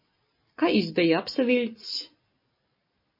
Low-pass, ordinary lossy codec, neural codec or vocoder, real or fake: 5.4 kHz; MP3, 24 kbps; none; real